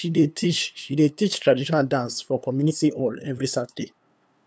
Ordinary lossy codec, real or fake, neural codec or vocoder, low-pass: none; fake; codec, 16 kHz, 2 kbps, FunCodec, trained on LibriTTS, 25 frames a second; none